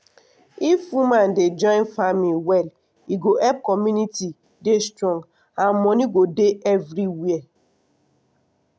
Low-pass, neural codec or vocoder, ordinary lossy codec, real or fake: none; none; none; real